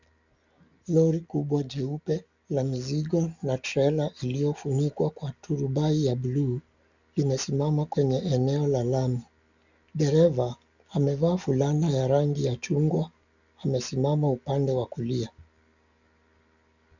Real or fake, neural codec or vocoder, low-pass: real; none; 7.2 kHz